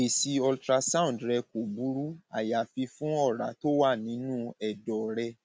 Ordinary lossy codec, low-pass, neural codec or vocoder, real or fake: none; none; none; real